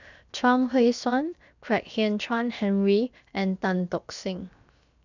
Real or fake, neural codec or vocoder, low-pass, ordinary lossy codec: fake; codec, 16 kHz, 0.7 kbps, FocalCodec; 7.2 kHz; none